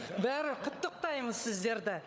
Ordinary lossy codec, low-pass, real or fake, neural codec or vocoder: none; none; fake; codec, 16 kHz, 16 kbps, FunCodec, trained on LibriTTS, 50 frames a second